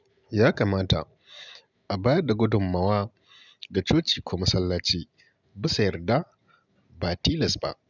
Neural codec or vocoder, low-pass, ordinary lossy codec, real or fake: none; 7.2 kHz; none; real